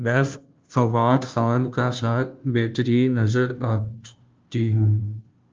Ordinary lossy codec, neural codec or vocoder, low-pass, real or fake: Opus, 24 kbps; codec, 16 kHz, 0.5 kbps, FunCodec, trained on Chinese and English, 25 frames a second; 7.2 kHz; fake